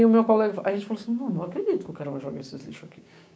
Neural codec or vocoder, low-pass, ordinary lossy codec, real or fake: codec, 16 kHz, 6 kbps, DAC; none; none; fake